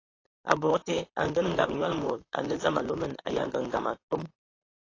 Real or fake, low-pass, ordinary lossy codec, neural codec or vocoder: fake; 7.2 kHz; AAC, 32 kbps; vocoder, 44.1 kHz, 128 mel bands, Pupu-Vocoder